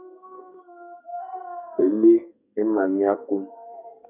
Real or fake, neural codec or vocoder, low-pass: fake; codec, 44.1 kHz, 2.6 kbps, SNAC; 3.6 kHz